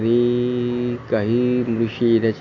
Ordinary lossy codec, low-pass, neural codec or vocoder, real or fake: none; 7.2 kHz; none; real